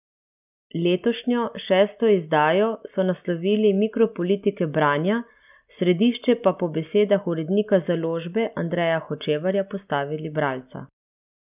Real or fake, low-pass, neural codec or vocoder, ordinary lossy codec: real; 3.6 kHz; none; none